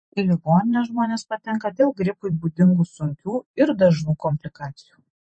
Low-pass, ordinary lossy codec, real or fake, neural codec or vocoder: 9.9 kHz; MP3, 32 kbps; fake; vocoder, 44.1 kHz, 128 mel bands every 512 samples, BigVGAN v2